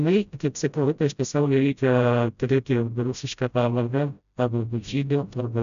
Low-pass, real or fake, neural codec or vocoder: 7.2 kHz; fake; codec, 16 kHz, 0.5 kbps, FreqCodec, smaller model